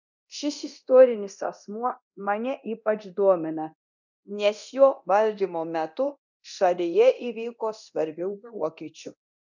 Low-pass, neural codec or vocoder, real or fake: 7.2 kHz; codec, 24 kHz, 0.9 kbps, DualCodec; fake